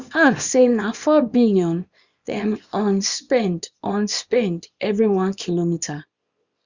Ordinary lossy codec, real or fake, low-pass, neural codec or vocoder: Opus, 64 kbps; fake; 7.2 kHz; codec, 24 kHz, 0.9 kbps, WavTokenizer, small release